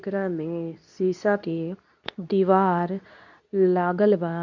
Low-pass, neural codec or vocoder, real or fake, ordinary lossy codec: 7.2 kHz; codec, 24 kHz, 0.9 kbps, WavTokenizer, medium speech release version 2; fake; none